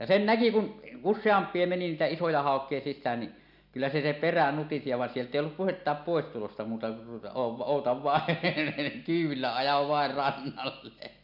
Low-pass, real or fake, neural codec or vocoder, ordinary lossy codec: 5.4 kHz; real; none; none